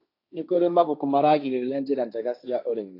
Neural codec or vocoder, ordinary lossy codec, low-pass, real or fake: codec, 16 kHz, 1.1 kbps, Voila-Tokenizer; AAC, 32 kbps; 5.4 kHz; fake